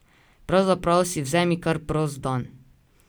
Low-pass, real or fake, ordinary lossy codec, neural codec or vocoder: none; real; none; none